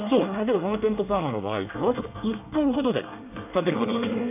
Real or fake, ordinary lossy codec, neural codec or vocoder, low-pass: fake; none; codec, 24 kHz, 1 kbps, SNAC; 3.6 kHz